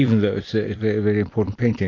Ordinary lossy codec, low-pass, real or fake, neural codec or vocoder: AAC, 32 kbps; 7.2 kHz; fake; vocoder, 44.1 kHz, 128 mel bands every 256 samples, BigVGAN v2